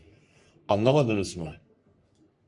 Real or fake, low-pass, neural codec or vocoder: fake; 10.8 kHz; codec, 44.1 kHz, 3.4 kbps, Pupu-Codec